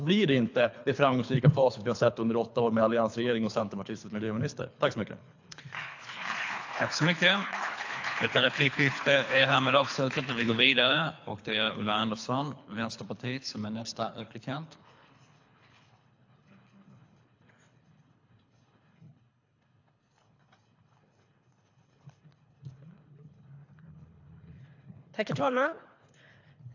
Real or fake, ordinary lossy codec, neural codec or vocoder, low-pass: fake; AAC, 48 kbps; codec, 24 kHz, 3 kbps, HILCodec; 7.2 kHz